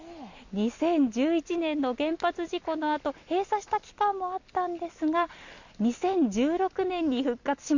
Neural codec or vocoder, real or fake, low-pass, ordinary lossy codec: none; real; 7.2 kHz; Opus, 64 kbps